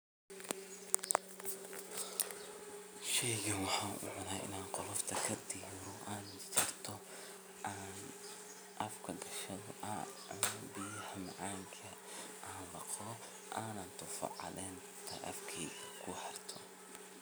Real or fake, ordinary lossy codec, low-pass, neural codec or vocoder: real; none; none; none